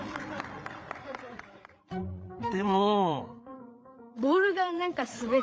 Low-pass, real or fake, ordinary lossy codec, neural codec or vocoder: none; fake; none; codec, 16 kHz, 8 kbps, FreqCodec, larger model